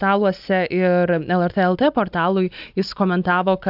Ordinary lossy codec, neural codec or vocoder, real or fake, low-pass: AAC, 48 kbps; none; real; 5.4 kHz